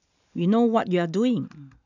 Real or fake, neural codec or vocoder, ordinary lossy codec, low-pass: real; none; none; 7.2 kHz